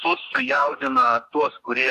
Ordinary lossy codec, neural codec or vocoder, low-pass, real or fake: AAC, 64 kbps; codec, 44.1 kHz, 2.6 kbps, DAC; 14.4 kHz; fake